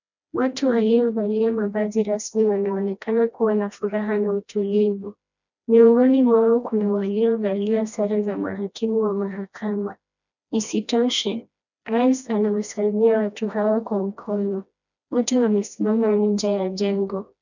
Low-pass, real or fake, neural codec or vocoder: 7.2 kHz; fake; codec, 16 kHz, 1 kbps, FreqCodec, smaller model